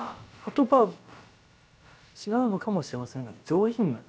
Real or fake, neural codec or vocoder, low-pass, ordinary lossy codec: fake; codec, 16 kHz, about 1 kbps, DyCAST, with the encoder's durations; none; none